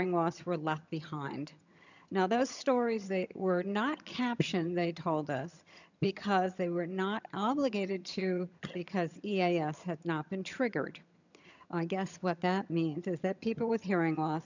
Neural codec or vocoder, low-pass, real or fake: vocoder, 22.05 kHz, 80 mel bands, HiFi-GAN; 7.2 kHz; fake